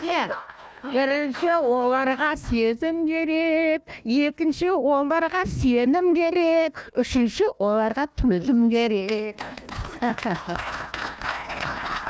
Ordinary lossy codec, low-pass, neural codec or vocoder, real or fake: none; none; codec, 16 kHz, 1 kbps, FunCodec, trained on Chinese and English, 50 frames a second; fake